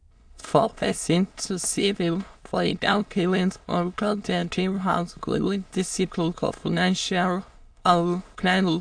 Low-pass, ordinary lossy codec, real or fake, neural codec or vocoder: 9.9 kHz; AAC, 64 kbps; fake; autoencoder, 22.05 kHz, a latent of 192 numbers a frame, VITS, trained on many speakers